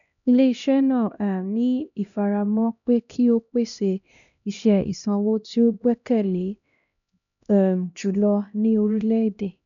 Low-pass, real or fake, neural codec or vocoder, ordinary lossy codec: 7.2 kHz; fake; codec, 16 kHz, 1 kbps, X-Codec, HuBERT features, trained on LibriSpeech; none